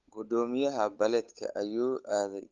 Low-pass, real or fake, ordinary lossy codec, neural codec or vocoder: 7.2 kHz; fake; Opus, 32 kbps; codec, 16 kHz, 8 kbps, FunCodec, trained on Chinese and English, 25 frames a second